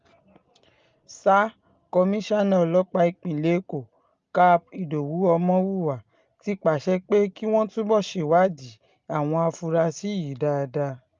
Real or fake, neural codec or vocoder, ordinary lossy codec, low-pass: real; none; Opus, 32 kbps; 7.2 kHz